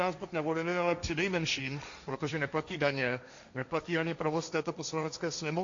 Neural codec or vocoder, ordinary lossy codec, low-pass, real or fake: codec, 16 kHz, 1.1 kbps, Voila-Tokenizer; AAC, 64 kbps; 7.2 kHz; fake